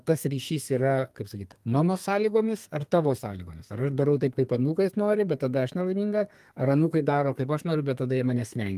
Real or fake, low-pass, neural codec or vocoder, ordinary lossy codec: fake; 14.4 kHz; codec, 32 kHz, 1.9 kbps, SNAC; Opus, 32 kbps